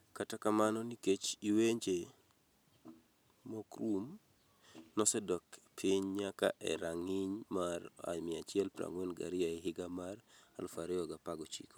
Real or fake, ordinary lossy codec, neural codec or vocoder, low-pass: real; none; none; none